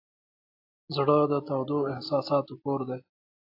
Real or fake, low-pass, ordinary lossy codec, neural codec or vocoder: real; 5.4 kHz; AAC, 32 kbps; none